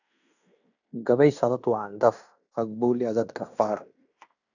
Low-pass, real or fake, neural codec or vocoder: 7.2 kHz; fake; codec, 16 kHz in and 24 kHz out, 0.9 kbps, LongCat-Audio-Codec, fine tuned four codebook decoder